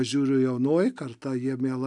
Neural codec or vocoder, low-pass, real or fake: none; 10.8 kHz; real